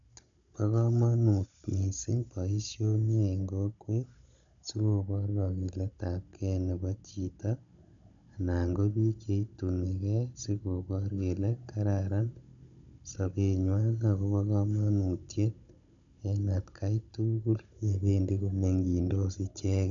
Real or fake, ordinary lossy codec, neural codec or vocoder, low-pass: fake; none; codec, 16 kHz, 16 kbps, FunCodec, trained on Chinese and English, 50 frames a second; 7.2 kHz